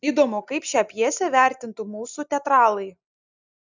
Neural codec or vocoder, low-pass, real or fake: none; 7.2 kHz; real